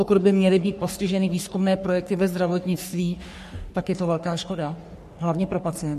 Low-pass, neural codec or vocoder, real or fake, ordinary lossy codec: 14.4 kHz; codec, 44.1 kHz, 3.4 kbps, Pupu-Codec; fake; MP3, 64 kbps